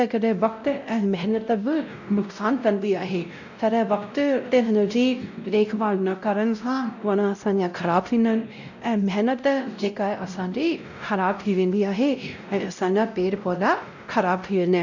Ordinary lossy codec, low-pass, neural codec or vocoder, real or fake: none; 7.2 kHz; codec, 16 kHz, 0.5 kbps, X-Codec, WavLM features, trained on Multilingual LibriSpeech; fake